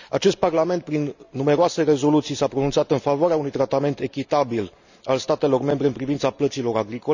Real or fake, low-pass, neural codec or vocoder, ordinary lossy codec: real; 7.2 kHz; none; none